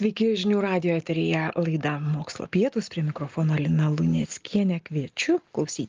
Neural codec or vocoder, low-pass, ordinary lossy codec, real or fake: none; 7.2 kHz; Opus, 32 kbps; real